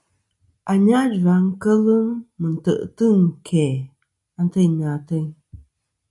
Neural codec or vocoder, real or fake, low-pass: none; real; 10.8 kHz